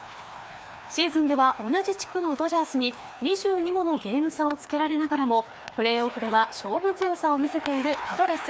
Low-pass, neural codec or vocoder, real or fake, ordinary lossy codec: none; codec, 16 kHz, 2 kbps, FreqCodec, larger model; fake; none